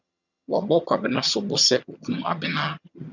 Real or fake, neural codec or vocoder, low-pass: fake; vocoder, 22.05 kHz, 80 mel bands, HiFi-GAN; 7.2 kHz